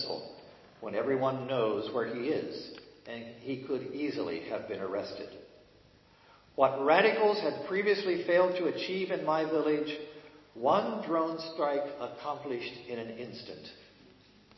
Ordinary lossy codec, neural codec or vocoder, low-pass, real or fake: MP3, 24 kbps; none; 7.2 kHz; real